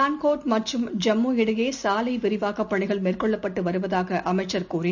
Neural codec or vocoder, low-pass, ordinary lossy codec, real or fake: none; 7.2 kHz; none; real